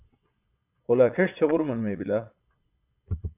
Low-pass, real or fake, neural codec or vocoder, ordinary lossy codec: 3.6 kHz; fake; vocoder, 22.05 kHz, 80 mel bands, Vocos; AAC, 32 kbps